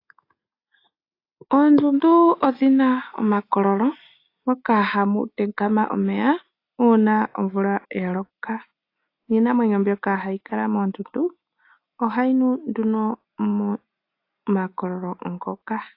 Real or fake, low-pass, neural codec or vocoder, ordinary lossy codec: real; 5.4 kHz; none; AAC, 32 kbps